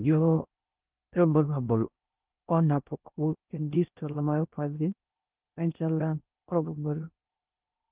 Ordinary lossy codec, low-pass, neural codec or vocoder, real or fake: Opus, 32 kbps; 3.6 kHz; codec, 16 kHz in and 24 kHz out, 0.6 kbps, FocalCodec, streaming, 4096 codes; fake